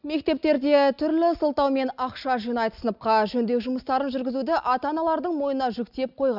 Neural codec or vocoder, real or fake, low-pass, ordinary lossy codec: none; real; 5.4 kHz; none